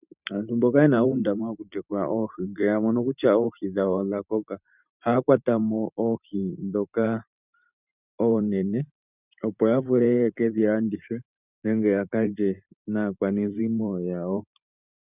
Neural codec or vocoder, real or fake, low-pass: vocoder, 44.1 kHz, 128 mel bands every 512 samples, BigVGAN v2; fake; 3.6 kHz